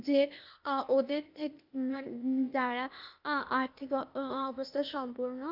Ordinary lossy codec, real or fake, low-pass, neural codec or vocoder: none; fake; 5.4 kHz; codec, 16 kHz, 0.8 kbps, ZipCodec